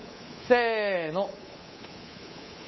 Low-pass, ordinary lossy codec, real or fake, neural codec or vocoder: 7.2 kHz; MP3, 24 kbps; fake; codec, 24 kHz, 3.1 kbps, DualCodec